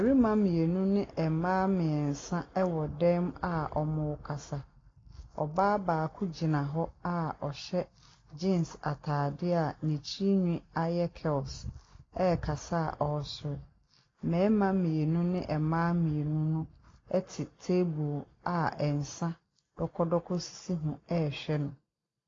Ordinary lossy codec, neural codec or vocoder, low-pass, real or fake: AAC, 32 kbps; none; 7.2 kHz; real